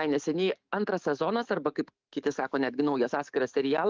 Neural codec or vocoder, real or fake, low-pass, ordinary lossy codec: codec, 44.1 kHz, 7.8 kbps, DAC; fake; 7.2 kHz; Opus, 32 kbps